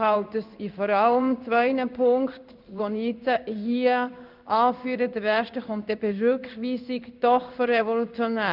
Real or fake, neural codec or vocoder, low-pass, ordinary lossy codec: fake; codec, 16 kHz in and 24 kHz out, 1 kbps, XY-Tokenizer; 5.4 kHz; none